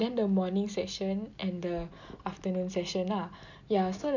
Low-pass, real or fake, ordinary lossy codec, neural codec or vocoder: 7.2 kHz; real; none; none